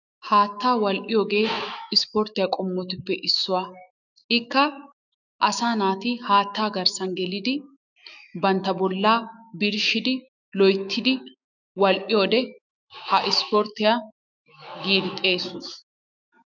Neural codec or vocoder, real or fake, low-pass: autoencoder, 48 kHz, 128 numbers a frame, DAC-VAE, trained on Japanese speech; fake; 7.2 kHz